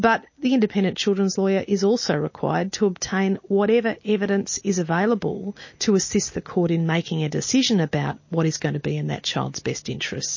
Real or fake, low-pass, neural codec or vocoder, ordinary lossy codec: real; 7.2 kHz; none; MP3, 32 kbps